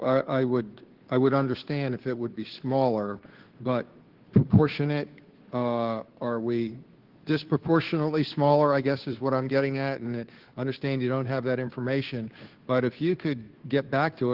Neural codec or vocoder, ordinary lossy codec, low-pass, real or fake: codec, 16 kHz, 2 kbps, FunCodec, trained on Chinese and English, 25 frames a second; Opus, 16 kbps; 5.4 kHz; fake